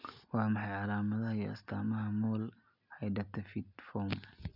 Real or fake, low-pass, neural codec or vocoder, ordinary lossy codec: real; 5.4 kHz; none; none